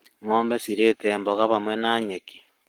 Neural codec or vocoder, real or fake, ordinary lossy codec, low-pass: codec, 44.1 kHz, 7.8 kbps, Pupu-Codec; fake; Opus, 24 kbps; 19.8 kHz